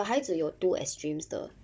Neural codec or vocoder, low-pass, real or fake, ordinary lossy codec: codec, 16 kHz, 16 kbps, FunCodec, trained on Chinese and English, 50 frames a second; none; fake; none